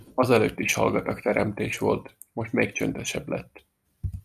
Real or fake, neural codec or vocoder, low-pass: real; none; 14.4 kHz